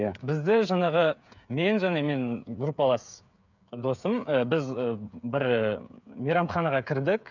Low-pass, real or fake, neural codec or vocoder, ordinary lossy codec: 7.2 kHz; fake; codec, 16 kHz, 8 kbps, FreqCodec, smaller model; none